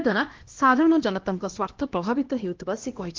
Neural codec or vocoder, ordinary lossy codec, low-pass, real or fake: codec, 16 kHz, 1 kbps, X-Codec, HuBERT features, trained on LibriSpeech; Opus, 16 kbps; 7.2 kHz; fake